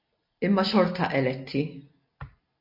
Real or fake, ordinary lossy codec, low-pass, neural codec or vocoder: real; MP3, 32 kbps; 5.4 kHz; none